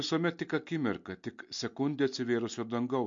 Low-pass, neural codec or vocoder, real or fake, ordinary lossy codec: 7.2 kHz; none; real; MP3, 48 kbps